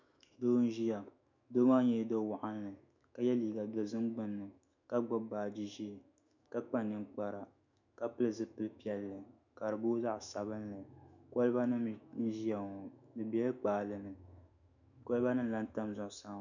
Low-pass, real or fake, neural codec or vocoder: 7.2 kHz; fake; autoencoder, 48 kHz, 128 numbers a frame, DAC-VAE, trained on Japanese speech